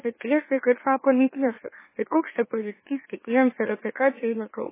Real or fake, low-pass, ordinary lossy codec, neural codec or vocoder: fake; 3.6 kHz; MP3, 16 kbps; autoencoder, 44.1 kHz, a latent of 192 numbers a frame, MeloTTS